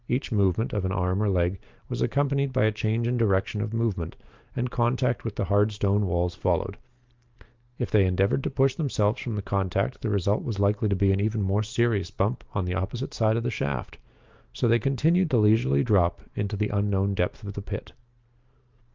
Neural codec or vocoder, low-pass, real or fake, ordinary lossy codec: vocoder, 44.1 kHz, 128 mel bands every 512 samples, BigVGAN v2; 7.2 kHz; fake; Opus, 32 kbps